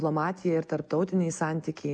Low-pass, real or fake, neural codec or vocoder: 9.9 kHz; real; none